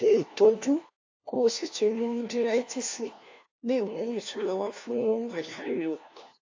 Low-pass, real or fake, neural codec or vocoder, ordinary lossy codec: 7.2 kHz; fake; codec, 16 kHz, 1 kbps, FunCodec, trained on LibriTTS, 50 frames a second; AAC, 48 kbps